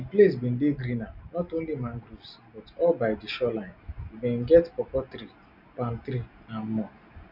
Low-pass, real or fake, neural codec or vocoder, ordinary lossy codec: 5.4 kHz; real; none; none